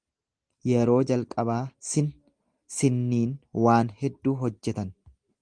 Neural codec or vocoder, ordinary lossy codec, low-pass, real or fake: none; Opus, 24 kbps; 9.9 kHz; real